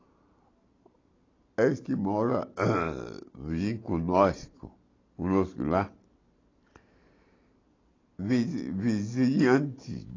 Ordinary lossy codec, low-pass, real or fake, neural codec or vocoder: AAC, 32 kbps; 7.2 kHz; real; none